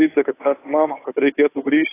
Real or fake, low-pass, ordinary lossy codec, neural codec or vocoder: fake; 3.6 kHz; AAC, 16 kbps; codec, 16 kHz, 2 kbps, FunCodec, trained on Chinese and English, 25 frames a second